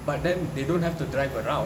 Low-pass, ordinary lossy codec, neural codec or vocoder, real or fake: 19.8 kHz; none; vocoder, 44.1 kHz, 128 mel bands every 256 samples, BigVGAN v2; fake